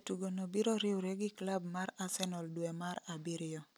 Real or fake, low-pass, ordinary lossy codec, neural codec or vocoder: real; none; none; none